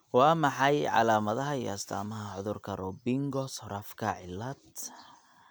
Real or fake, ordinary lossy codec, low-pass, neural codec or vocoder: real; none; none; none